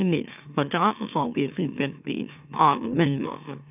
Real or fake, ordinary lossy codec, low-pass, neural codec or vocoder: fake; none; 3.6 kHz; autoencoder, 44.1 kHz, a latent of 192 numbers a frame, MeloTTS